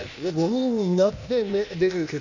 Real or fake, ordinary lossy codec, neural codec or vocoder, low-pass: fake; none; codec, 16 kHz, 0.8 kbps, ZipCodec; 7.2 kHz